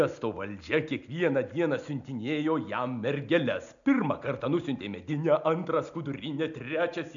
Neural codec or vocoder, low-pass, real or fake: none; 7.2 kHz; real